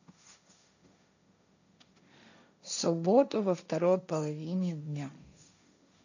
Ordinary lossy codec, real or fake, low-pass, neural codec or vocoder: none; fake; 7.2 kHz; codec, 16 kHz, 1.1 kbps, Voila-Tokenizer